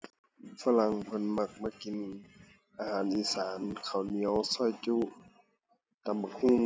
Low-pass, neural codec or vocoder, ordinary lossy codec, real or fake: none; none; none; real